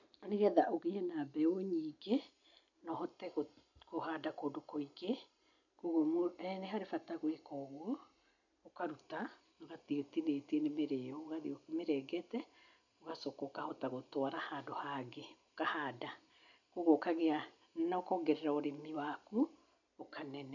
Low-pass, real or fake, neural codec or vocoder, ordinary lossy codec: 7.2 kHz; real; none; none